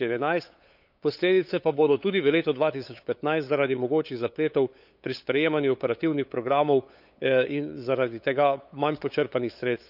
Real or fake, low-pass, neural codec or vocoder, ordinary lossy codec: fake; 5.4 kHz; codec, 16 kHz, 8 kbps, FunCodec, trained on LibriTTS, 25 frames a second; none